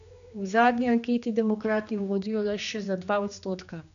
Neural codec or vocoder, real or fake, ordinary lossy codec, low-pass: codec, 16 kHz, 2 kbps, X-Codec, HuBERT features, trained on general audio; fake; none; 7.2 kHz